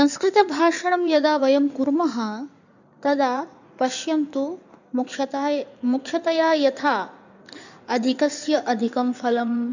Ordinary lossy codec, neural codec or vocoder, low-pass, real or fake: none; codec, 16 kHz in and 24 kHz out, 2.2 kbps, FireRedTTS-2 codec; 7.2 kHz; fake